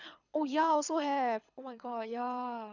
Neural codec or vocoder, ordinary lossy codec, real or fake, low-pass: codec, 24 kHz, 6 kbps, HILCodec; none; fake; 7.2 kHz